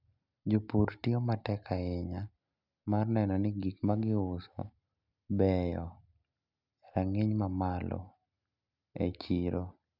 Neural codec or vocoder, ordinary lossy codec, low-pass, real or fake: none; none; 5.4 kHz; real